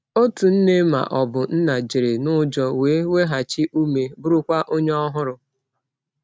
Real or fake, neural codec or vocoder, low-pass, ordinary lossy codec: real; none; none; none